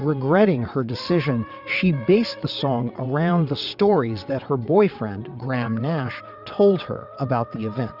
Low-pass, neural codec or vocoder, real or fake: 5.4 kHz; vocoder, 22.05 kHz, 80 mel bands, WaveNeXt; fake